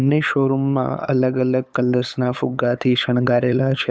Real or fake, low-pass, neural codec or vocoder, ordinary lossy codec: fake; none; codec, 16 kHz, 8 kbps, FunCodec, trained on LibriTTS, 25 frames a second; none